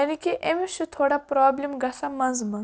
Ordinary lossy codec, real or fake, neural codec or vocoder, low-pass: none; real; none; none